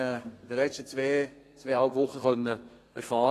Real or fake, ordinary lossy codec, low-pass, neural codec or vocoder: fake; AAC, 48 kbps; 14.4 kHz; codec, 32 kHz, 1.9 kbps, SNAC